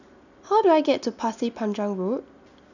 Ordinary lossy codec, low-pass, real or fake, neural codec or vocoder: none; 7.2 kHz; real; none